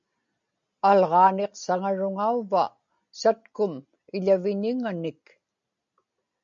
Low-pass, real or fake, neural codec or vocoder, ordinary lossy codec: 7.2 kHz; real; none; MP3, 64 kbps